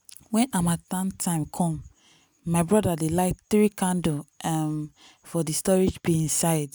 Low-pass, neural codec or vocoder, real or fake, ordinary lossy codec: none; none; real; none